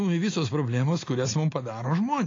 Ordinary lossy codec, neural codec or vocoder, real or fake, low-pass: AAC, 32 kbps; none; real; 7.2 kHz